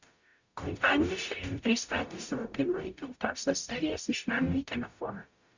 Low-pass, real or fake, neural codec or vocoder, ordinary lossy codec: 7.2 kHz; fake; codec, 44.1 kHz, 0.9 kbps, DAC; Opus, 64 kbps